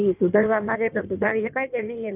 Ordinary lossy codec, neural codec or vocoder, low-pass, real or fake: none; codec, 16 kHz in and 24 kHz out, 1.1 kbps, FireRedTTS-2 codec; 3.6 kHz; fake